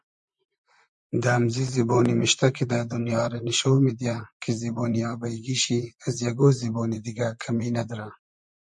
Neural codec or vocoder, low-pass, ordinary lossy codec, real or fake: vocoder, 44.1 kHz, 128 mel bands, Pupu-Vocoder; 10.8 kHz; MP3, 64 kbps; fake